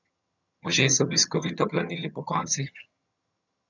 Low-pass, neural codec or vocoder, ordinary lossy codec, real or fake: 7.2 kHz; vocoder, 22.05 kHz, 80 mel bands, HiFi-GAN; none; fake